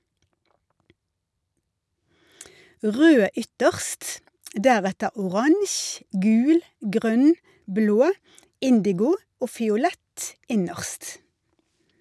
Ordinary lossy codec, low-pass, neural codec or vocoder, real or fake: none; none; none; real